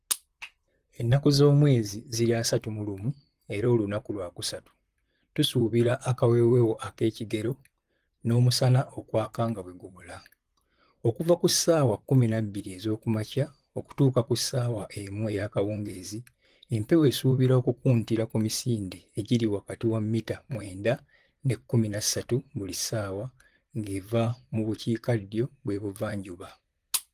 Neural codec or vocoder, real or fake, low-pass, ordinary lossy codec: vocoder, 44.1 kHz, 128 mel bands, Pupu-Vocoder; fake; 14.4 kHz; Opus, 24 kbps